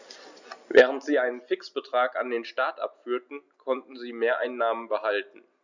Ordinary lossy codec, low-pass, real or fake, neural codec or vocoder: none; 7.2 kHz; real; none